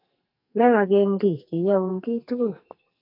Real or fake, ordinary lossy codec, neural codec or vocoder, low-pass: fake; AAC, 48 kbps; codec, 44.1 kHz, 2.6 kbps, SNAC; 5.4 kHz